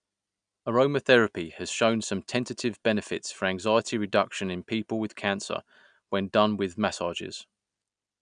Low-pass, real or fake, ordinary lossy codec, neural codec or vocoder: 9.9 kHz; real; none; none